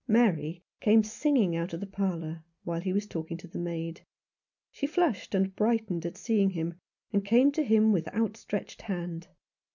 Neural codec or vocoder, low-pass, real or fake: none; 7.2 kHz; real